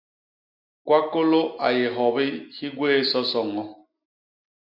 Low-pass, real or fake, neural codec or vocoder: 5.4 kHz; real; none